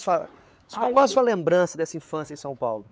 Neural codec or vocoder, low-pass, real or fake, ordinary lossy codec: codec, 16 kHz, 4 kbps, X-Codec, WavLM features, trained on Multilingual LibriSpeech; none; fake; none